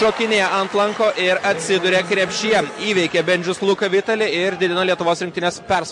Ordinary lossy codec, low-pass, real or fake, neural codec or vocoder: MP3, 48 kbps; 10.8 kHz; real; none